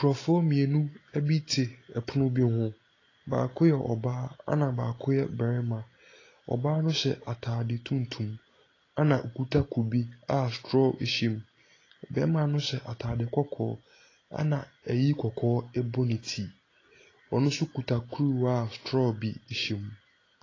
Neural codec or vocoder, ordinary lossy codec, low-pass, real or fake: none; AAC, 32 kbps; 7.2 kHz; real